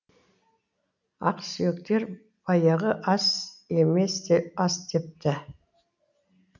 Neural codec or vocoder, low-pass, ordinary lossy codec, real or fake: none; 7.2 kHz; none; real